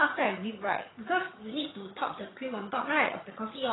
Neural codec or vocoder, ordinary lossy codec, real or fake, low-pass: vocoder, 22.05 kHz, 80 mel bands, HiFi-GAN; AAC, 16 kbps; fake; 7.2 kHz